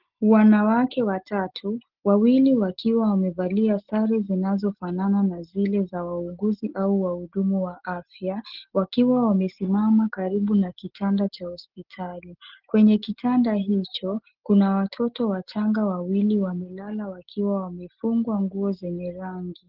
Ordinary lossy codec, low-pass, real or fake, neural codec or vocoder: Opus, 16 kbps; 5.4 kHz; real; none